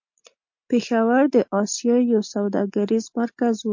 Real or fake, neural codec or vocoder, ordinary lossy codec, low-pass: real; none; MP3, 48 kbps; 7.2 kHz